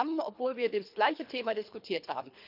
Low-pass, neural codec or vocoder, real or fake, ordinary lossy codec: 5.4 kHz; codec, 24 kHz, 3 kbps, HILCodec; fake; none